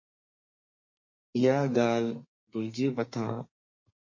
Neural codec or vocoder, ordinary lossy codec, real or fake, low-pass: codec, 32 kHz, 1.9 kbps, SNAC; MP3, 32 kbps; fake; 7.2 kHz